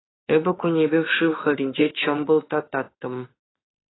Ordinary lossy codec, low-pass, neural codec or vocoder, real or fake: AAC, 16 kbps; 7.2 kHz; autoencoder, 48 kHz, 32 numbers a frame, DAC-VAE, trained on Japanese speech; fake